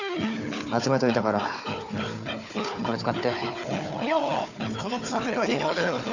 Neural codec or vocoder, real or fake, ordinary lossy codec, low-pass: codec, 16 kHz, 4 kbps, FunCodec, trained on Chinese and English, 50 frames a second; fake; none; 7.2 kHz